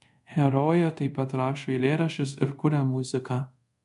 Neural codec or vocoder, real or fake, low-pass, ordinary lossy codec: codec, 24 kHz, 0.5 kbps, DualCodec; fake; 10.8 kHz; MP3, 96 kbps